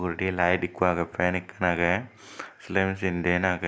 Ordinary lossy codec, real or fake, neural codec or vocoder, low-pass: none; real; none; none